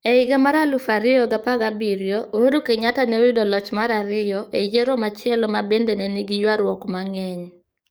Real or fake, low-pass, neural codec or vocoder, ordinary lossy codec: fake; none; codec, 44.1 kHz, 7.8 kbps, DAC; none